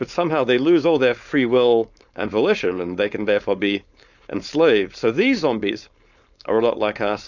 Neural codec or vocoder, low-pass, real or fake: codec, 16 kHz, 4.8 kbps, FACodec; 7.2 kHz; fake